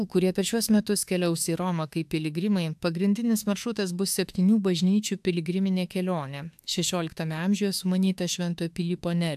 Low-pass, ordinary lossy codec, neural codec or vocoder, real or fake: 14.4 kHz; AAC, 96 kbps; autoencoder, 48 kHz, 32 numbers a frame, DAC-VAE, trained on Japanese speech; fake